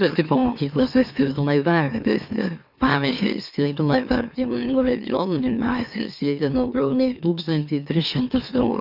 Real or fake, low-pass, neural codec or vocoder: fake; 5.4 kHz; autoencoder, 44.1 kHz, a latent of 192 numbers a frame, MeloTTS